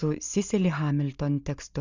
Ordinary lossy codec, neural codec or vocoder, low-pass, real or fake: Opus, 64 kbps; none; 7.2 kHz; real